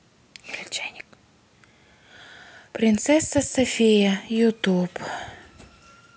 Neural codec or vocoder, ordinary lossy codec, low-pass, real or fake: none; none; none; real